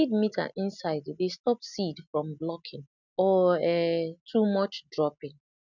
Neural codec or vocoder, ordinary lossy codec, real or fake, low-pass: none; none; real; 7.2 kHz